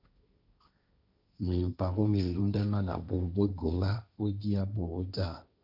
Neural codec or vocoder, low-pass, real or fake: codec, 16 kHz, 1.1 kbps, Voila-Tokenizer; 5.4 kHz; fake